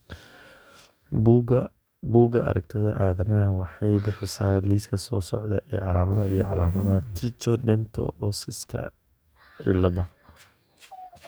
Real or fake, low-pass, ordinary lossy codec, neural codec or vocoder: fake; none; none; codec, 44.1 kHz, 2.6 kbps, DAC